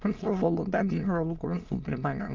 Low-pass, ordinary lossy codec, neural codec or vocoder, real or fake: 7.2 kHz; Opus, 32 kbps; autoencoder, 22.05 kHz, a latent of 192 numbers a frame, VITS, trained on many speakers; fake